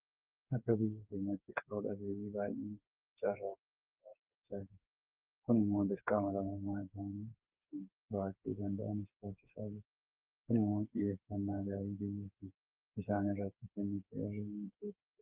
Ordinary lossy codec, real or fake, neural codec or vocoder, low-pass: Opus, 24 kbps; fake; codec, 16 kHz, 4 kbps, FreqCodec, smaller model; 3.6 kHz